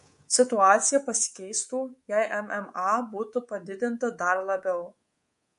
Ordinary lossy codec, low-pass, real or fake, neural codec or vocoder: MP3, 48 kbps; 10.8 kHz; fake; codec, 24 kHz, 3.1 kbps, DualCodec